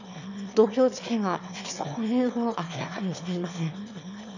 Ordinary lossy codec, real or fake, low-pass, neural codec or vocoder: none; fake; 7.2 kHz; autoencoder, 22.05 kHz, a latent of 192 numbers a frame, VITS, trained on one speaker